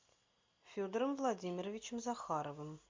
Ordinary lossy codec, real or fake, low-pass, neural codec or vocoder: MP3, 48 kbps; real; 7.2 kHz; none